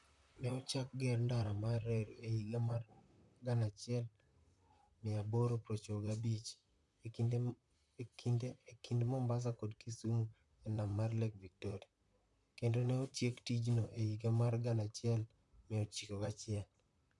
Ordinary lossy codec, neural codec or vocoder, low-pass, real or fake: none; vocoder, 44.1 kHz, 128 mel bands, Pupu-Vocoder; 10.8 kHz; fake